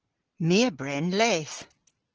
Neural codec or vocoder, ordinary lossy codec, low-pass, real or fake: none; Opus, 16 kbps; 7.2 kHz; real